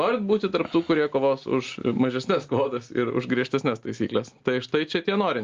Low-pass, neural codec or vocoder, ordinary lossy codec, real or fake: 7.2 kHz; none; Opus, 32 kbps; real